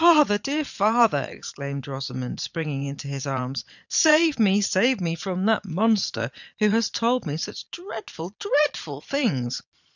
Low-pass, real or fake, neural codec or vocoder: 7.2 kHz; fake; vocoder, 22.05 kHz, 80 mel bands, Vocos